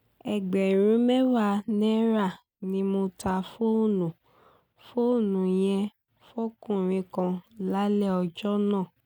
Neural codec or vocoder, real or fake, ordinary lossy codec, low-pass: none; real; none; 19.8 kHz